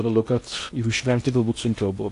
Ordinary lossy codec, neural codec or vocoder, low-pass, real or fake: AAC, 48 kbps; codec, 16 kHz in and 24 kHz out, 0.8 kbps, FocalCodec, streaming, 65536 codes; 10.8 kHz; fake